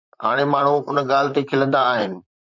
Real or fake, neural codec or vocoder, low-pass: fake; vocoder, 44.1 kHz, 128 mel bands, Pupu-Vocoder; 7.2 kHz